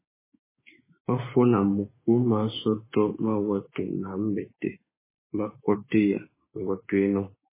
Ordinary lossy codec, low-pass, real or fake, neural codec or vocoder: MP3, 16 kbps; 3.6 kHz; fake; autoencoder, 48 kHz, 32 numbers a frame, DAC-VAE, trained on Japanese speech